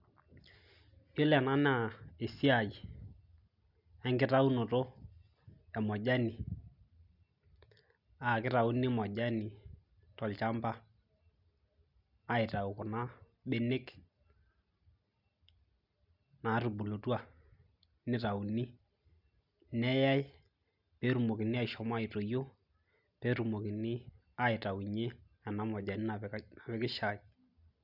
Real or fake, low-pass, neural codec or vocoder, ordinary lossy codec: real; 5.4 kHz; none; none